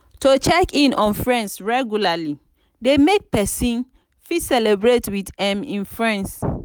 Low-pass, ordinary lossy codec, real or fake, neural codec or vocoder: none; none; real; none